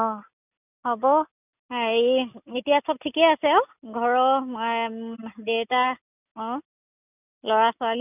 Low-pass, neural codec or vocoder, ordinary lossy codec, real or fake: 3.6 kHz; none; none; real